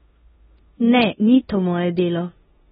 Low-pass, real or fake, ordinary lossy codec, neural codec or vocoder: 10.8 kHz; fake; AAC, 16 kbps; codec, 16 kHz in and 24 kHz out, 0.9 kbps, LongCat-Audio-Codec, four codebook decoder